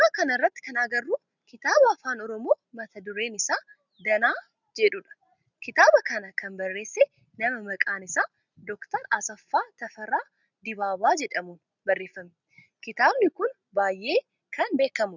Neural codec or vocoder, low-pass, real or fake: none; 7.2 kHz; real